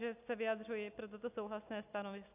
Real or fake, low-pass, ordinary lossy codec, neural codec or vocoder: real; 3.6 kHz; AAC, 32 kbps; none